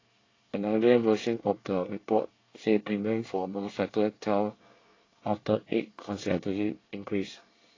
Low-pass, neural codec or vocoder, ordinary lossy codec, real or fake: 7.2 kHz; codec, 24 kHz, 1 kbps, SNAC; AAC, 32 kbps; fake